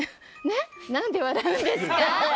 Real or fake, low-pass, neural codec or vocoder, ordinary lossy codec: real; none; none; none